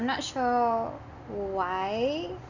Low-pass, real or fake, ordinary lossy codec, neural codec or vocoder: 7.2 kHz; real; none; none